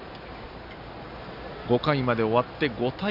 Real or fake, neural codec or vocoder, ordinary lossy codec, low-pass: real; none; none; 5.4 kHz